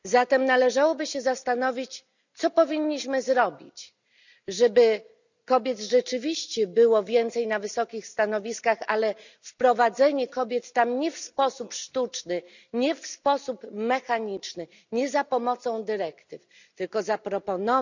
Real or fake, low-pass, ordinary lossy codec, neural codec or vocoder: real; 7.2 kHz; none; none